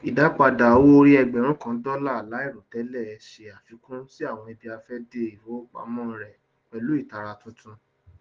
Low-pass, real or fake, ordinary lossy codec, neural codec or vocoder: 7.2 kHz; real; Opus, 16 kbps; none